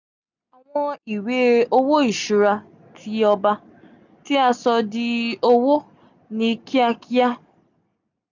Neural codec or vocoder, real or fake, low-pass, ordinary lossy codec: none; real; 7.2 kHz; none